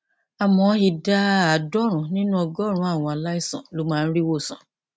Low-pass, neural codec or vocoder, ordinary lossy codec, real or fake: none; none; none; real